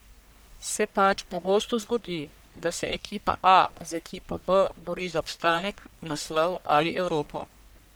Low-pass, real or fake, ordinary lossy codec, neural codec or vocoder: none; fake; none; codec, 44.1 kHz, 1.7 kbps, Pupu-Codec